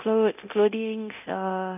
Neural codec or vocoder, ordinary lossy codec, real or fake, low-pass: codec, 24 kHz, 0.9 kbps, DualCodec; none; fake; 3.6 kHz